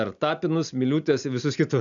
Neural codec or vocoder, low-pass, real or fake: none; 7.2 kHz; real